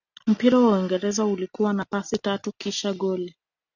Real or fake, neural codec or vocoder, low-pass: real; none; 7.2 kHz